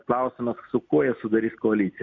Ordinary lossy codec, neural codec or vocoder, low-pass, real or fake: MP3, 48 kbps; none; 7.2 kHz; real